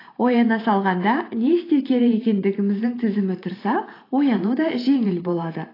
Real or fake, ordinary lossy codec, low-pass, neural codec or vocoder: fake; AAC, 24 kbps; 5.4 kHz; vocoder, 44.1 kHz, 128 mel bands every 512 samples, BigVGAN v2